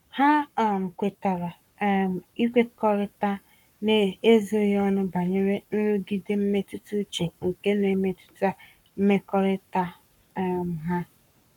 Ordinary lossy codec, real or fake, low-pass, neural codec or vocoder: none; fake; 19.8 kHz; codec, 44.1 kHz, 7.8 kbps, Pupu-Codec